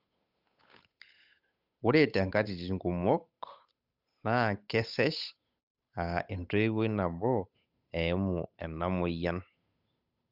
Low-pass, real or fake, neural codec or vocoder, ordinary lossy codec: 5.4 kHz; fake; codec, 16 kHz, 8 kbps, FunCodec, trained on Chinese and English, 25 frames a second; none